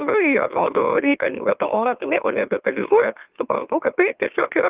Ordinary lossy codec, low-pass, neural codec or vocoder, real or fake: Opus, 24 kbps; 3.6 kHz; autoencoder, 44.1 kHz, a latent of 192 numbers a frame, MeloTTS; fake